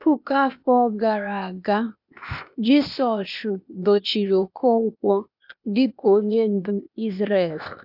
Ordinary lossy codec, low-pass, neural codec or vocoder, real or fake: none; 5.4 kHz; codec, 16 kHz, 0.8 kbps, ZipCodec; fake